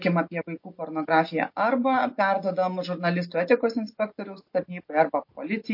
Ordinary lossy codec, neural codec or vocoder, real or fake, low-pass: MP3, 32 kbps; none; real; 5.4 kHz